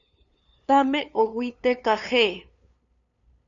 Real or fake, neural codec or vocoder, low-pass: fake; codec, 16 kHz, 2 kbps, FunCodec, trained on LibriTTS, 25 frames a second; 7.2 kHz